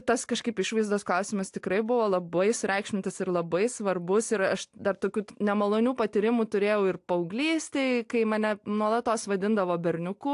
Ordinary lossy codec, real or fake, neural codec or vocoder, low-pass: AAC, 64 kbps; real; none; 10.8 kHz